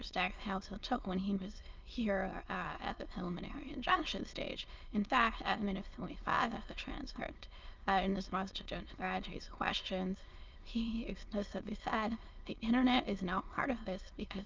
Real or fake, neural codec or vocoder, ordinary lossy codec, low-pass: fake; autoencoder, 22.05 kHz, a latent of 192 numbers a frame, VITS, trained on many speakers; Opus, 32 kbps; 7.2 kHz